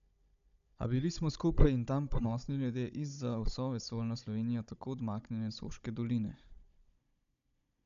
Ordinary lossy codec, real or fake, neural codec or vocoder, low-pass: none; fake; codec, 16 kHz, 16 kbps, FunCodec, trained on Chinese and English, 50 frames a second; 7.2 kHz